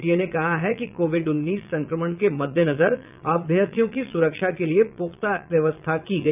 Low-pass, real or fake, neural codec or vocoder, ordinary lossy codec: 3.6 kHz; fake; vocoder, 22.05 kHz, 80 mel bands, Vocos; none